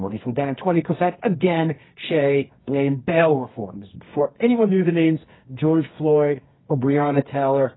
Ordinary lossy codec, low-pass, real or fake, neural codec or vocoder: AAC, 16 kbps; 7.2 kHz; fake; codec, 24 kHz, 0.9 kbps, WavTokenizer, medium music audio release